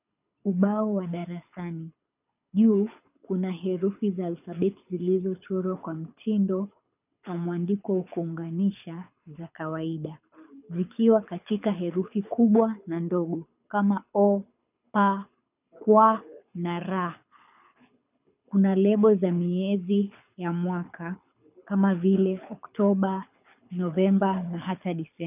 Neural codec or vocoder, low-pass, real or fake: codec, 24 kHz, 6 kbps, HILCodec; 3.6 kHz; fake